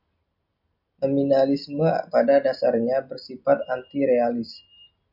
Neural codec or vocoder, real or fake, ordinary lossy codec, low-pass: none; real; MP3, 48 kbps; 5.4 kHz